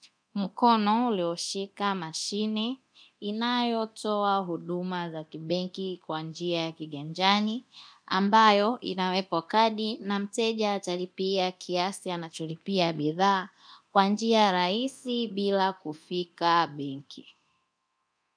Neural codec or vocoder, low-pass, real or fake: codec, 24 kHz, 0.9 kbps, DualCodec; 9.9 kHz; fake